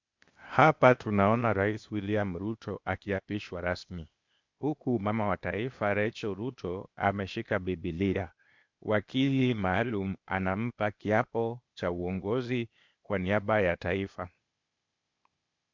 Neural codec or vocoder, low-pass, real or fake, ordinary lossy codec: codec, 16 kHz, 0.8 kbps, ZipCodec; 7.2 kHz; fake; MP3, 64 kbps